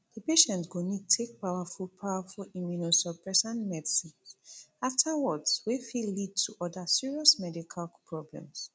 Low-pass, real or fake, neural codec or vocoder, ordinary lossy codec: none; real; none; none